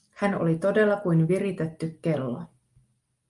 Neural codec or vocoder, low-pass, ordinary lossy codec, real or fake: none; 10.8 kHz; Opus, 32 kbps; real